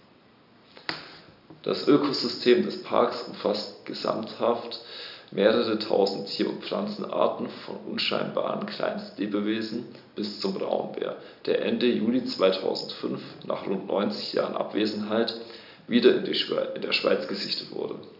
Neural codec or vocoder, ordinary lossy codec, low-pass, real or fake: none; none; 5.4 kHz; real